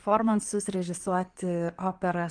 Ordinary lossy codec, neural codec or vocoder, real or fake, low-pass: Opus, 24 kbps; codec, 16 kHz in and 24 kHz out, 2.2 kbps, FireRedTTS-2 codec; fake; 9.9 kHz